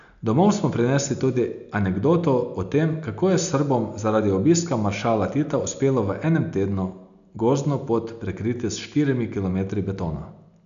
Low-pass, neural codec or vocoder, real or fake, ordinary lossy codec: 7.2 kHz; none; real; none